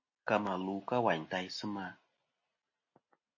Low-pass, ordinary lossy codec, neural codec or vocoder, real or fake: 7.2 kHz; MP3, 32 kbps; none; real